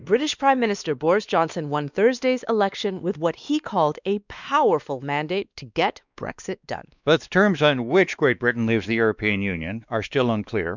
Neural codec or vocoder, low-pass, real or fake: codec, 16 kHz, 2 kbps, X-Codec, WavLM features, trained on Multilingual LibriSpeech; 7.2 kHz; fake